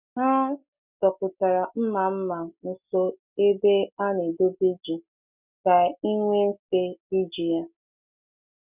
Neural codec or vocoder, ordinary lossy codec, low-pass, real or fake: none; none; 3.6 kHz; real